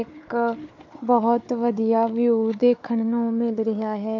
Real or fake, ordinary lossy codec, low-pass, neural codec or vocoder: fake; MP3, 48 kbps; 7.2 kHz; codec, 16 kHz, 4 kbps, FunCodec, trained on Chinese and English, 50 frames a second